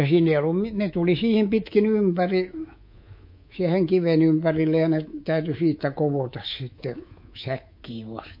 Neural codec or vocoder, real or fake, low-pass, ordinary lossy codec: codec, 24 kHz, 3.1 kbps, DualCodec; fake; 5.4 kHz; MP3, 32 kbps